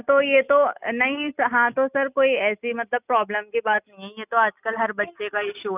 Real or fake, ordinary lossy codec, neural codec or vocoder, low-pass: real; none; none; 3.6 kHz